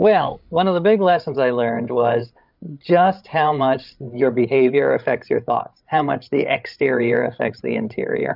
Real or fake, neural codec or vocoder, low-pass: fake; codec, 16 kHz, 8 kbps, FreqCodec, larger model; 5.4 kHz